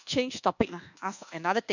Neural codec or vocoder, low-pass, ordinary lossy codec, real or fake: codec, 24 kHz, 1.2 kbps, DualCodec; 7.2 kHz; none; fake